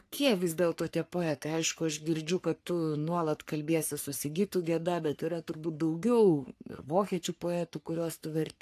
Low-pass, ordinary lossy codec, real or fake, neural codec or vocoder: 14.4 kHz; AAC, 64 kbps; fake; codec, 44.1 kHz, 3.4 kbps, Pupu-Codec